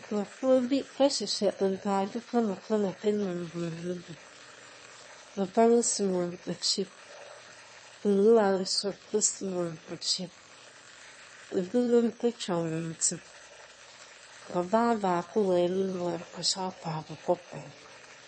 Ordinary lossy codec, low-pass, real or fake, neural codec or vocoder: MP3, 32 kbps; 9.9 kHz; fake; autoencoder, 22.05 kHz, a latent of 192 numbers a frame, VITS, trained on one speaker